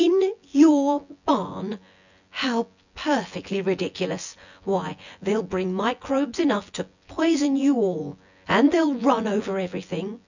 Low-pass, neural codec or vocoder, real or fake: 7.2 kHz; vocoder, 24 kHz, 100 mel bands, Vocos; fake